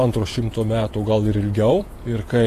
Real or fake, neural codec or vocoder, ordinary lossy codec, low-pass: fake; vocoder, 48 kHz, 128 mel bands, Vocos; AAC, 48 kbps; 14.4 kHz